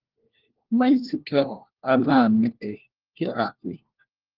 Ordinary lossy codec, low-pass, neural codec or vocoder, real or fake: Opus, 16 kbps; 5.4 kHz; codec, 16 kHz, 1 kbps, FunCodec, trained on LibriTTS, 50 frames a second; fake